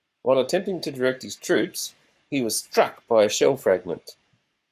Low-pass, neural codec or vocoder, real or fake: 14.4 kHz; codec, 44.1 kHz, 7.8 kbps, Pupu-Codec; fake